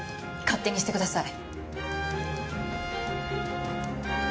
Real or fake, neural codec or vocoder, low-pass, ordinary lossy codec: real; none; none; none